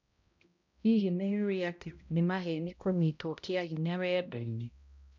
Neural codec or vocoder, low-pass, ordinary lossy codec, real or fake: codec, 16 kHz, 0.5 kbps, X-Codec, HuBERT features, trained on balanced general audio; 7.2 kHz; none; fake